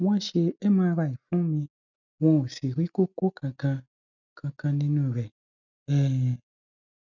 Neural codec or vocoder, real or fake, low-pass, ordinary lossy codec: none; real; 7.2 kHz; none